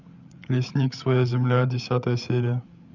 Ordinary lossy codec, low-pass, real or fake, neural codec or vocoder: none; 7.2 kHz; fake; codec, 16 kHz, 8 kbps, FreqCodec, larger model